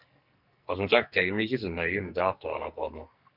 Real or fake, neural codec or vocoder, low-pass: fake; codec, 44.1 kHz, 2.6 kbps, SNAC; 5.4 kHz